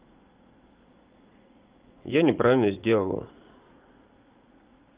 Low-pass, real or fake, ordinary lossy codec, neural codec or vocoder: 3.6 kHz; fake; Opus, 32 kbps; vocoder, 44.1 kHz, 80 mel bands, Vocos